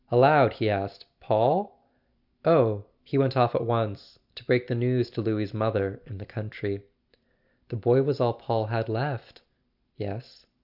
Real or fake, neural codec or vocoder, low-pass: real; none; 5.4 kHz